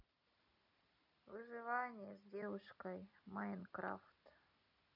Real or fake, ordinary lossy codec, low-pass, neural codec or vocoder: real; none; 5.4 kHz; none